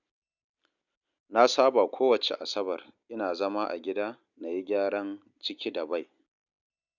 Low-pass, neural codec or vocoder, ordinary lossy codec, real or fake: 7.2 kHz; none; none; real